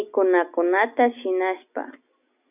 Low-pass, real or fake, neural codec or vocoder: 3.6 kHz; real; none